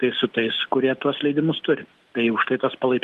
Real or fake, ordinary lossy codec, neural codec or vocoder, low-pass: real; Opus, 24 kbps; none; 14.4 kHz